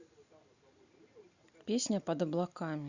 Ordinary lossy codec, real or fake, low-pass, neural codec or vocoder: Opus, 64 kbps; real; 7.2 kHz; none